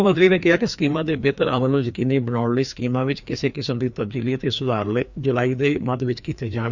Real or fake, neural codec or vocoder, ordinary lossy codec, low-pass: fake; codec, 16 kHz, 2 kbps, FreqCodec, larger model; none; 7.2 kHz